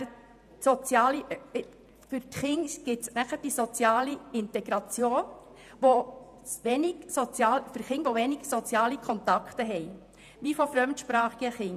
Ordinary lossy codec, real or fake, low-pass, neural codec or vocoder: none; fake; 14.4 kHz; vocoder, 48 kHz, 128 mel bands, Vocos